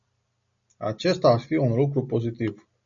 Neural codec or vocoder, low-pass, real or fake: none; 7.2 kHz; real